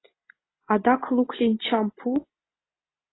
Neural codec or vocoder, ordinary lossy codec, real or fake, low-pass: none; AAC, 16 kbps; real; 7.2 kHz